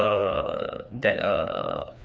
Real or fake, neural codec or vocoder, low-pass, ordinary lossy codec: fake; codec, 16 kHz, 2 kbps, FreqCodec, larger model; none; none